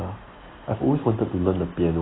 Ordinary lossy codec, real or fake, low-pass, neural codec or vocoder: AAC, 16 kbps; real; 7.2 kHz; none